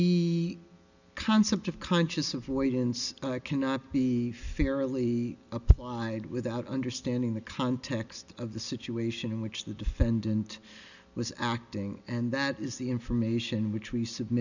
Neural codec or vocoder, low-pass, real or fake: none; 7.2 kHz; real